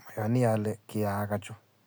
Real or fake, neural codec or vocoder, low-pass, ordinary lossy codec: real; none; none; none